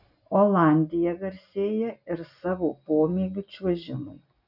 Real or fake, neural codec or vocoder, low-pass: real; none; 5.4 kHz